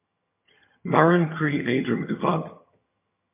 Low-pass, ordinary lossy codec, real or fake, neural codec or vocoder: 3.6 kHz; MP3, 24 kbps; fake; vocoder, 22.05 kHz, 80 mel bands, HiFi-GAN